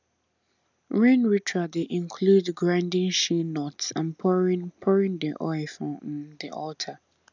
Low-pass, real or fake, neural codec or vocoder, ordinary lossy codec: 7.2 kHz; real; none; none